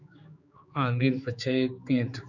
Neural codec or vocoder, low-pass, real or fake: codec, 16 kHz, 4 kbps, X-Codec, HuBERT features, trained on general audio; 7.2 kHz; fake